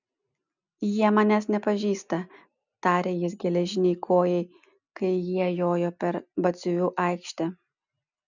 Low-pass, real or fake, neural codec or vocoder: 7.2 kHz; real; none